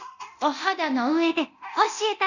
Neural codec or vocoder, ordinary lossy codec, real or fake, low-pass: codec, 24 kHz, 0.9 kbps, DualCodec; none; fake; 7.2 kHz